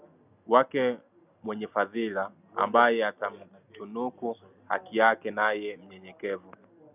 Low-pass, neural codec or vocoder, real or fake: 3.6 kHz; none; real